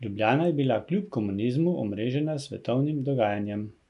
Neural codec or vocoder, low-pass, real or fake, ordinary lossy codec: none; 10.8 kHz; real; none